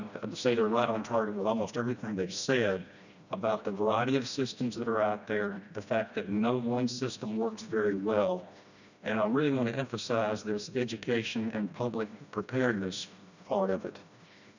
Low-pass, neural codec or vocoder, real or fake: 7.2 kHz; codec, 16 kHz, 1 kbps, FreqCodec, smaller model; fake